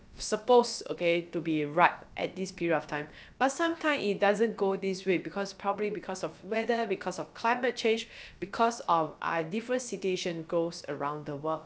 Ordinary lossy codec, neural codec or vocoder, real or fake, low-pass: none; codec, 16 kHz, about 1 kbps, DyCAST, with the encoder's durations; fake; none